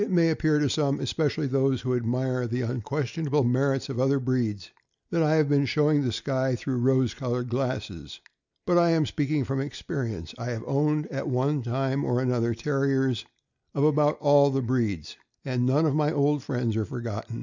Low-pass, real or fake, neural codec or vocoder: 7.2 kHz; real; none